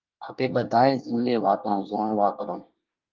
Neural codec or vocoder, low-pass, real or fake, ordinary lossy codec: codec, 24 kHz, 1 kbps, SNAC; 7.2 kHz; fake; Opus, 32 kbps